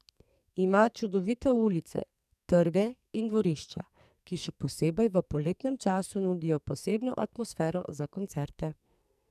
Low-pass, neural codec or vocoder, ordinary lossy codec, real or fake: 14.4 kHz; codec, 44.1 kHz, 2.6 kbps, SNAC; none; fake